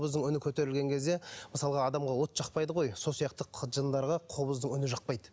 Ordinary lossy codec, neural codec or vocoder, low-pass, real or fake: none; none; none; real